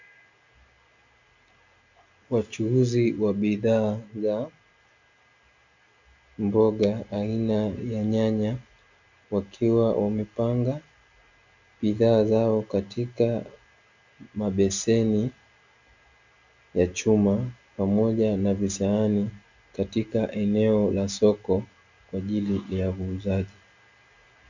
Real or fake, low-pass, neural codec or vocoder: real; 7.2 kHz; none